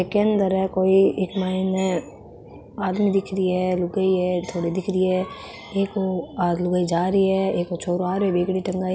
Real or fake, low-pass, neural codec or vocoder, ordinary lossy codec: real; none; none; none